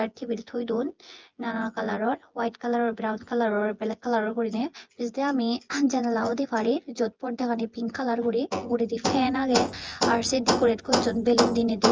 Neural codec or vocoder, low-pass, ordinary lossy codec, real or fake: vocoder, 24 kHz, 100 mel bands, Vocos; 7.2 kHz; Opus, 24 kbps; fake